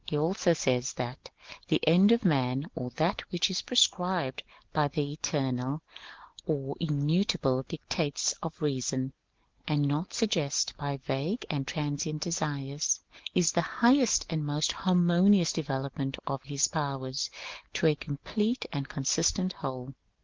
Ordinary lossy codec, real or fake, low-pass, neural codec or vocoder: Opus, 16 kbps; real; 7.2 kHz; none